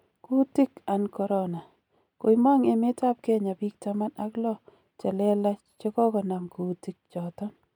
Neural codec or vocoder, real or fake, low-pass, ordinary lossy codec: none; real; 19.8 kHz; MP3, 96 kbps